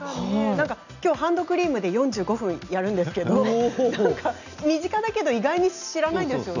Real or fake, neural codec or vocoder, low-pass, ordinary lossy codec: real; none; 7.2 kHz; none